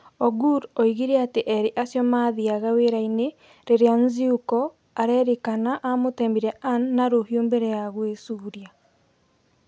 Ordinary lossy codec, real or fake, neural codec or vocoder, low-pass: none; real; none; none